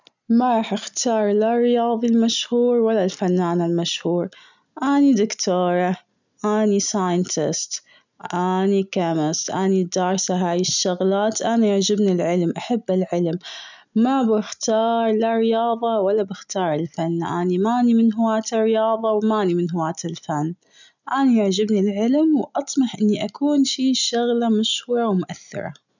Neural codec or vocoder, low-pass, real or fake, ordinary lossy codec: none; 7.2 kHz; real; none